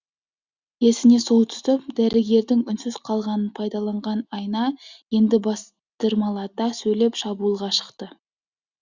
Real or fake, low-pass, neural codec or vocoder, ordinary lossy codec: real; 7.2 kHz; none; Opus, 64 kbps